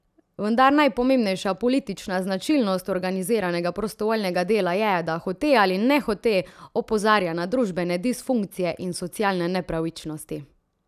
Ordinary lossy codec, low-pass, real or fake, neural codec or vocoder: none; 14.4 kHz; real; none